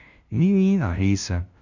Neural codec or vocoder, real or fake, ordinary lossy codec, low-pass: codec, 16 kHz, 0.5 kbps, FunCodec, trained on LibriTTS, 25 frames a second; fake; none; 7.2 kHz